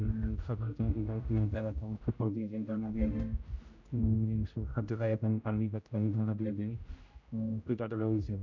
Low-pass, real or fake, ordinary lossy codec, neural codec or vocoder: 7.2 kHz; fake; MP3, 64 kbps; codec, 16 kHz, 0.5 kbps, X-Codec, HuBERT features, trained on general audio